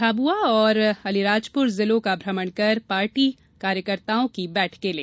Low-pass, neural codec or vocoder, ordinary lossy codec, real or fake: none; none; none; real